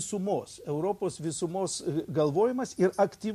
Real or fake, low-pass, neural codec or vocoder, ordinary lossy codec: real; 14.4 kHz; none; MP3, 64 kbps